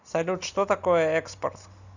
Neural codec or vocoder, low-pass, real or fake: none; 7.2 kHz; real